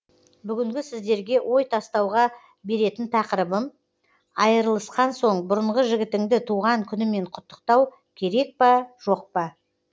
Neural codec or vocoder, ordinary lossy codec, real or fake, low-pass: none; none; real; none